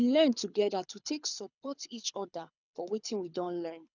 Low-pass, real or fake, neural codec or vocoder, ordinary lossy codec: 7.2 kHz; fake; codec, 24 kHz, 6 kbps, HILCodec; none